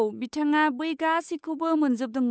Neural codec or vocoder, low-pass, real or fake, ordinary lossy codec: codec, 16 kHz, 8 kbps, FunCodec, trained on Chinese and English, 25 frames a second; none; fake; none